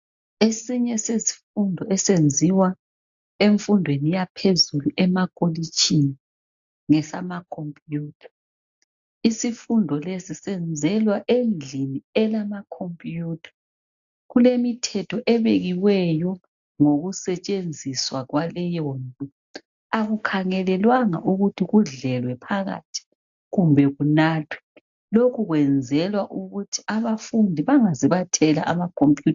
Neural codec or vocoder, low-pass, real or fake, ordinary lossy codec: none; 7.2 kHz; real; AAC, 64 kbps